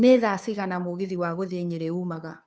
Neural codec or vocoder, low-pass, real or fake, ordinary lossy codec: codec, 16 kHz, 2 kbps, FunCodec, trained on Chinese and English, 25 frames a second; none; fake; none